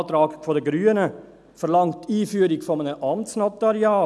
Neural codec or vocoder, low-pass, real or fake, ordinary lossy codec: none; none; real; none